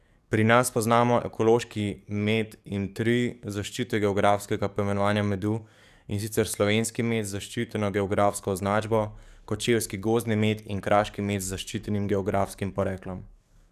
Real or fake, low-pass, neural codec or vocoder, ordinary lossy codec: fake; 14.4 kHz; codec, 44.1 kHz, 7.8 kbps, DAC; none